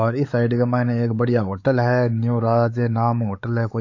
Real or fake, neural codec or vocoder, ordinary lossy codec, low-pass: fake; codec, 16 kHz, 4 kbps, X-Codec, WavLM features, trained on Multilingual LibriSpeech; AAC, 48 kbps; 7.2 kHz